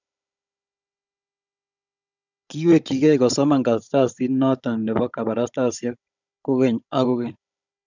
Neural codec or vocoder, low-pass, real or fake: codec, 16 kHz, 16 kbps, FunCodec, trained on Chinese and English, 50 frames a second; 7.2 kHz; fake